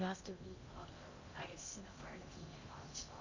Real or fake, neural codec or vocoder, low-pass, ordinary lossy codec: fake; codec, 16 kHz in and 24 kHz out, 0.6 kbps, FocalCodec, streaming, 2048 codes; 7.2 kHz; Opus, 64 kbps